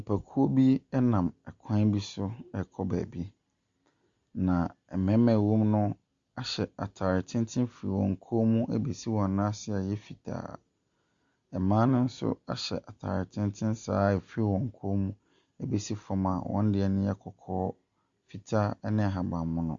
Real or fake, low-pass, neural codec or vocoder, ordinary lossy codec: real; 7.2 kHz; none; Opus, 64 kbps